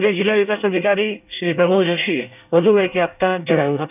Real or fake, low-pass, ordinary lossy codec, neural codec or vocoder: fake; 3.6 kHz; none; codec, 24 kHz, 1 kbps, SNAC